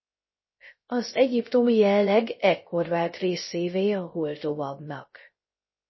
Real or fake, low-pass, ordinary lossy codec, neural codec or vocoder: fake; 7.2 kHz; MP3, 24 kbps; codec, 16 kHz, 0.3 kbps, FocalCodec